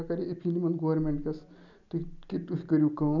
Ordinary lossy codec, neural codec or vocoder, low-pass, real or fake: none; none; 7.2 kHz; real